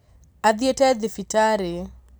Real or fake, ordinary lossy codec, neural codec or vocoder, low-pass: real; none; none; none